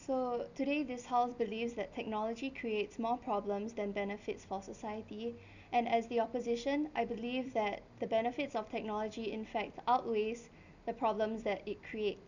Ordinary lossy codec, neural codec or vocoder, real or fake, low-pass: none; none; real; 7.2 kHz